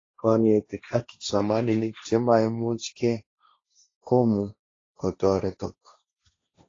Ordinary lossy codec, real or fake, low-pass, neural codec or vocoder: AAC, 32 kbps; fake; 7.2 kHz; codec, 16 kHz, 1.1 kbps, Voila-Tokenizer